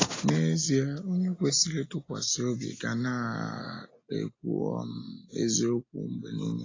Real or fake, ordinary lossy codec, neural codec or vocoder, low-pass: real; AAC, 32 kbps; none; 7.2 kHz